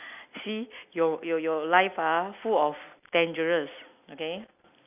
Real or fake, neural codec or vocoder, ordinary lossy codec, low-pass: real; none; none; 3.6 kHz